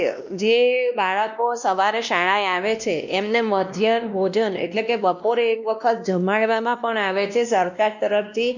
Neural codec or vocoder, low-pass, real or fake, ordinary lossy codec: codec, 16 kHz, 1 kbps, X-Codec, WavLM features, trained on Multilingual LibriSpeech; 7.2 kHz; fake; none